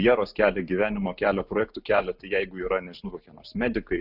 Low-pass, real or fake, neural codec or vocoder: 5.4 kHz; real; none